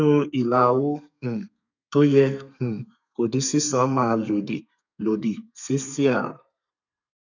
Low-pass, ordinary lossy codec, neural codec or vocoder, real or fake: 7.2 kHz; none; codec, 32 kHz, 1.9 kbps, SNAC; fake